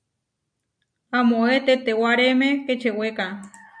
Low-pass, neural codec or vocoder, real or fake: 9.9 kHz; none; real